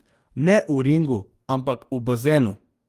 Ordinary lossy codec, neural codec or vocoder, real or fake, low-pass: Opus, 32 kbps; codec, 44.1 kHz, 2.6 kbps, DAC; fake; 14.4 kHz